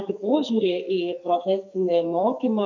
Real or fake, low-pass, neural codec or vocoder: fake; 7.2 kHz; codec, 44.1 kHz, 2.6 kbps, SNAC